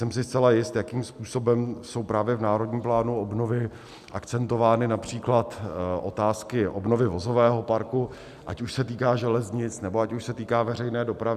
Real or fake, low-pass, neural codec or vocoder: real; 14.4 kHz; none